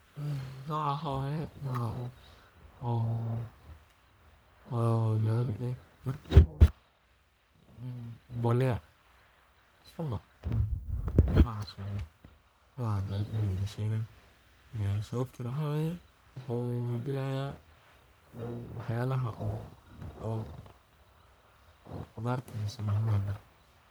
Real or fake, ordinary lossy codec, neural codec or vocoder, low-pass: fake; none; codec, 44.1 kHz, 1.7 kbps, Pupu-Codec; none